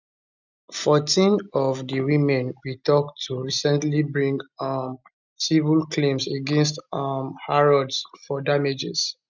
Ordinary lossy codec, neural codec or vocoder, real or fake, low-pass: none; none; real; 7.2 kHz